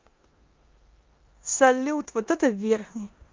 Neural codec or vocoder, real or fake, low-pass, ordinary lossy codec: codec, 16 kHz in and 24 kHz out, 0.9 kbps, LongCat-Audio-Codec, four codebook decoder; fake; 7.2 kHz; Opus, 32 kbps